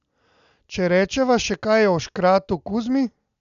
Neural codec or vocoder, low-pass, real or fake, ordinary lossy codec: none; 7.2 kHz; real; none